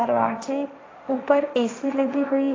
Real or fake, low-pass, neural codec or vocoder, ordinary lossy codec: fake; 7.2 kHz; codec, 16 kHz, 1.1 kbps, Voila-Tokenizer; AAC, 32 kbps